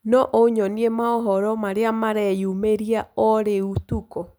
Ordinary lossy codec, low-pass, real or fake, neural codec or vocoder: none; none; real; none